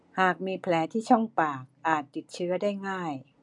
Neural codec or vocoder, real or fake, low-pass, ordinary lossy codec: none; real; 10.8 kHz; MP3, 96 kbps